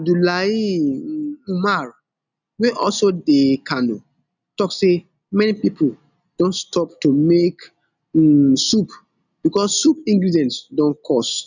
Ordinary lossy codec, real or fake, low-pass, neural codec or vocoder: none; real; 7.2 kHz; none